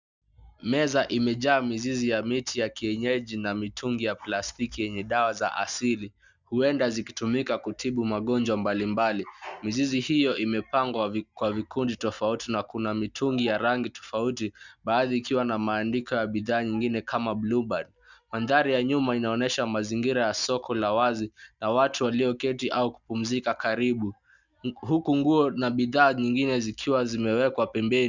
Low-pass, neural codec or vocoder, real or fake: 7.2 kHz; none; real